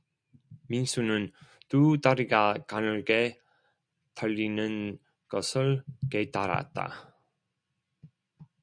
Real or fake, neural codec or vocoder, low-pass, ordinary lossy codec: real; none; 9.9 kHz; MP3, 96 kbps